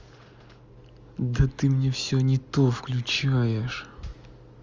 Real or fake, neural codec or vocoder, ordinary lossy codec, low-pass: real; none; Opus, 32 kbps; 7.2 kHz